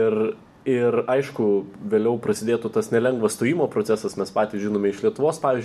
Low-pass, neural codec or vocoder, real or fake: 14.4 kHz; none; real